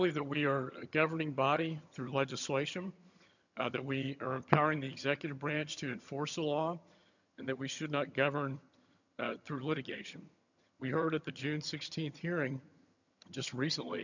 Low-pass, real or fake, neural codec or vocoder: 7.2 kHz; fake; vocoder, 22.05 kHz, 80 mel bands, HiFi-GAN